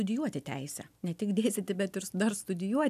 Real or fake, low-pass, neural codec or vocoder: real; 14.4 kHz; none